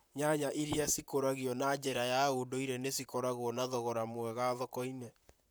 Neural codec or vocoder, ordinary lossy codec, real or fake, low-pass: vocoder, 44.1 kHz, 128 mel bands, Pupu-Vocoder; none; fake; none